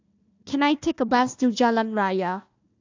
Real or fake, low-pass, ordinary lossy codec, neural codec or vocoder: fake; 7.2 kHz; AAC, 48 kbps; codec, 16 kHz, 1 kbps, FunCodec, trained on Chinese and English, 50 frames a second